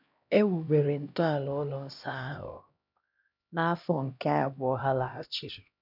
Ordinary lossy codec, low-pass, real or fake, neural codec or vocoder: none; 5.4 kHz; fake; codec, 16 kHz, 1 kbps, X-Codec, HuBERT features, trained on LibriSpeech